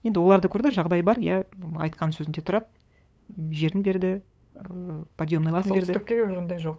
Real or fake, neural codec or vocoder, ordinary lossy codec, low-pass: fake; codec, 16 kHz, 8 kbps, FunCodec, trained on LibriTTS, 25 frames a second; none; none